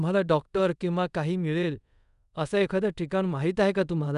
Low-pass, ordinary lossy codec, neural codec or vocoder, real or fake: 10.8 kHz; none; codec, 24 kHz, 0.5 kbps, DualCodec; fake